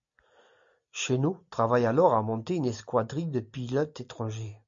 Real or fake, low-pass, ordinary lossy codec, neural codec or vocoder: real; 7.2 kHz; MP3, 48 kbps; none